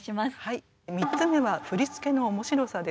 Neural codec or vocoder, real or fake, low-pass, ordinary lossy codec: none; real; none; none